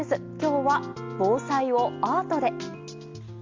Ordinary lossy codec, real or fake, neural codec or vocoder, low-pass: Opus, 32 kbps; real; none; 7.2 kHz